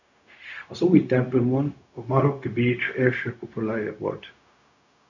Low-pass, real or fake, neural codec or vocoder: 7.2 kHz; fake; codec, 16 kHz, 0.4 kbps, LongCat-Audio-Codec